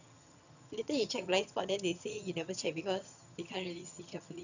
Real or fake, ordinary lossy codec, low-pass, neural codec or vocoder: fake; none; 7.2 kHz; vocoder, 22.05 kHz, 80 mel bands, HiFi-GAN